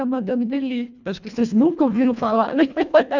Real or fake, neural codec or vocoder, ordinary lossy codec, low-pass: fake; codec, 24 kHz, 1.5 kbps, HILCodec; none; 7.2 kHz